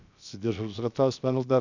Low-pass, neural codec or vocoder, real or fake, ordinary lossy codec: 7.2 kHz; codec, 16 kHz, 0.7 kbps, FocalCodec; fake; none